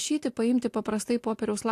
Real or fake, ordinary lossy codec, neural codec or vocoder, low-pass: real; AAC, 64 kbps; none; 14.4 kHz